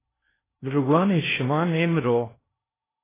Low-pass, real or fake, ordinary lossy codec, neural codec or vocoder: 3.6 kHz; fake; AAC, 16 kbps; codec, 16 kHz in and 24 kHz out, 0.8 kbps, FocalCodec, streaming, 65536 codes